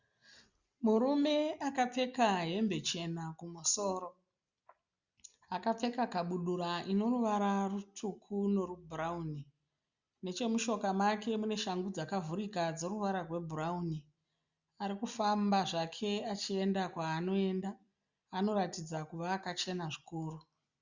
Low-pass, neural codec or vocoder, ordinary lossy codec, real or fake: 7.2 kHz; none; Opus, 64 kbps; real